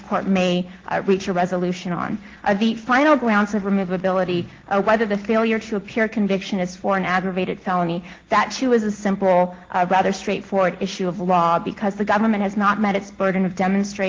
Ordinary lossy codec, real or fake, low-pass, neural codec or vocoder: Opus, 16 kbps; real; 7.2 kHz; none